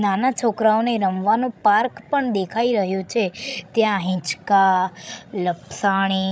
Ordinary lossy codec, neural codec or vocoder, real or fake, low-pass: none; codec, 16 kHz, 16 kbps, FreqCodec, larger model; fake; none